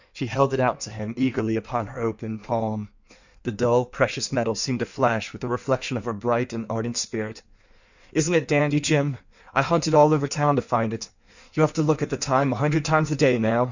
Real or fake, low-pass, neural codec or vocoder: fake; 7.2 kHz; codec, 16 kHz in and 24 kHz out, 1.1 kbps, FireRedTTS-2 codec